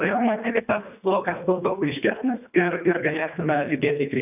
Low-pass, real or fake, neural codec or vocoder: 3.6 kHz; fake; codec, 24 kHz, 1.5 kbps, HILCodec